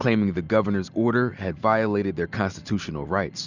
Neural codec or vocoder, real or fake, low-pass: none; real; 7.2 kHz